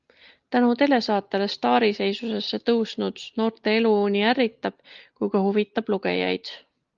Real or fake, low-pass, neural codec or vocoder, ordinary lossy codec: real; 7.2 kHz; none; Opus, 32 kbps